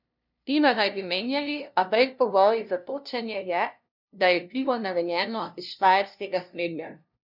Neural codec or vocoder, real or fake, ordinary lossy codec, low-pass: codec, 16 kHz, 0.5 kbps, FunCodec, trained on LibriTTS, 25 frames a second; fake; none; 5.4 kHz